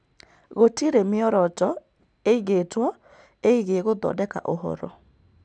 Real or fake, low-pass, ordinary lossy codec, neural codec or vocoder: real; 9.9 kHz; none; none